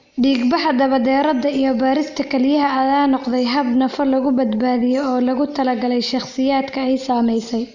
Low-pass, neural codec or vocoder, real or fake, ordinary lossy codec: 7.2 kHz; none; real; none